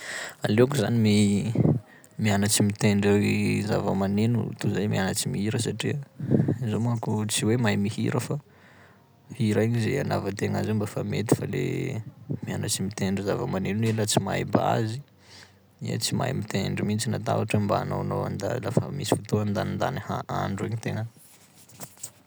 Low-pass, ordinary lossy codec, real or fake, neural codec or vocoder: none; none; real; none